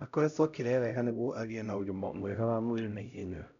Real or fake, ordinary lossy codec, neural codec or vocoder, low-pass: fake; none; codec, 16 kHz, 0.5 kbps, X-Codec, HuBERT features, trained on LibriSpeech; 7.2 kHz